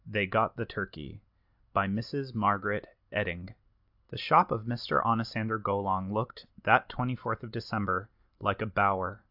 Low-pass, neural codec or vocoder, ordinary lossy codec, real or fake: 5.4 kHz; none; AAC, 48 kbps; real